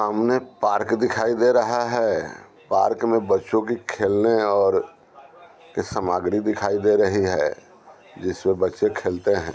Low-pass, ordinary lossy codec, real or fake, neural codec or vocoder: none; none; real; none